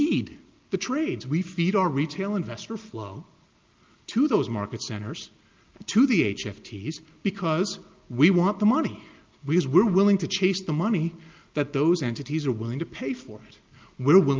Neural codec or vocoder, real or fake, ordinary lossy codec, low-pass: none; real; Opus, 24 kbps; 7.2 kHz